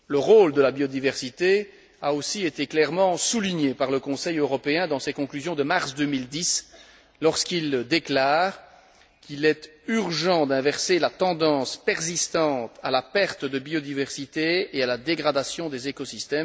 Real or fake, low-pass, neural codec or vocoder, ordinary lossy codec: real; none; none; none